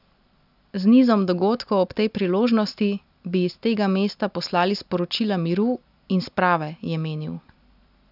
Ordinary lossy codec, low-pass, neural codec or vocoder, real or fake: none; 5.4 kHz; none; real